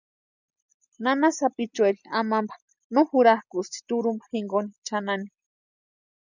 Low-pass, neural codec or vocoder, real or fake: 7.2 kHz; none; real